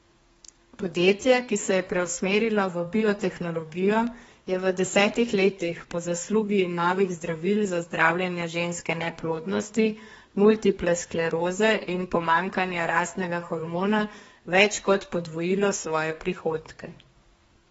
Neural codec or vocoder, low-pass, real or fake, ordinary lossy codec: codec, 32 kHz, 1.9 kbps, SNAC; 14.4 kHz; fake; AAC, 24 kbps